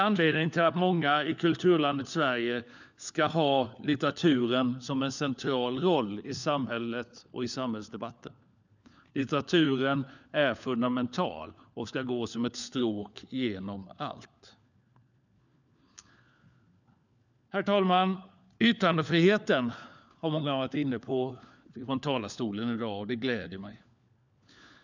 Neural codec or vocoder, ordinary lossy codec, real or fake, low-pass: codec, 16 kHz, 4 kbps, FunCodec, trained on LibriTTS, 50 frames a second; none; fake; 7.2 kHz